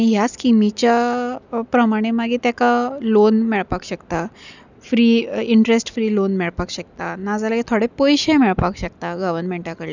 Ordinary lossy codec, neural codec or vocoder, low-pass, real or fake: none; none; 7.2 kHz; real